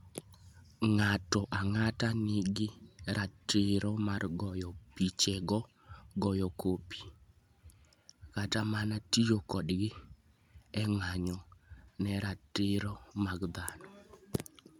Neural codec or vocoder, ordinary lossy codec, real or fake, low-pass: none; MP3, 96 kbps; real; 19.8 kHz